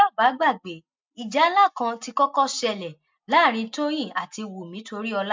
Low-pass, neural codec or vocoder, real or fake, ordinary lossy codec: 7.2 kHz; none; real; MP3, 64 kbps